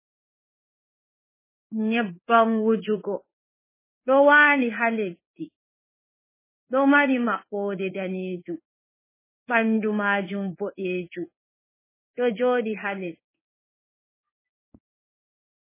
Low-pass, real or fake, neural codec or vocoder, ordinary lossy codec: 3.6 kHz; fake; codec, 16 kHz in and 24 kHz out, 1 kbps, XY-Tokenizer; MP3, 16 kbps